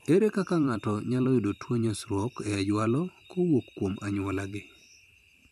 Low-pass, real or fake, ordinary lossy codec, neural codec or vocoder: 14.4 kHz; fake; none; vocoder, 44.1 kHz, 128 mel bands every 512 samples, BigVGAN v2